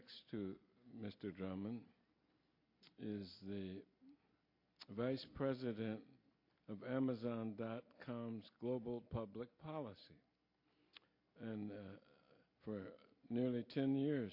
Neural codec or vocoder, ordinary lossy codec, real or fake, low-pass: none; MP3, 32 kbps; real; 5.4 kHz